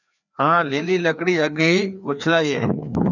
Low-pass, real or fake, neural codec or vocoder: 7.2 kHz; fake; codec, 16 kHz, 2 kbps, FreqCodec, larger model